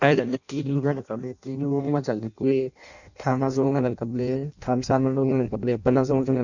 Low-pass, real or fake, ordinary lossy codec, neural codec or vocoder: 7.2 kHz; fake; none; codec, 16 kHz in and 24 kHz out, 0.6 kbps, FireRedTTS-2 codec